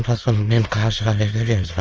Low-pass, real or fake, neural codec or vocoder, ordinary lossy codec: 7.2 kHz; fake; autoencoder, 22.05 kHz, a latent of 192 numbers a frame, VITS, trained on many speakers; Opus, 24 kbps